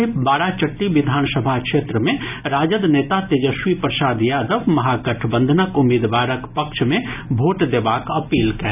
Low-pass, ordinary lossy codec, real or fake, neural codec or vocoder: 3.6 kHz; none; real; none